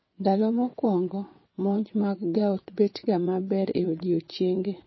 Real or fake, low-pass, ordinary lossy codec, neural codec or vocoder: fake; 7.2 kHz; MP3, 24 kbps; vocoder, 22.05 kHz, 80 mel bands, WaveNeXt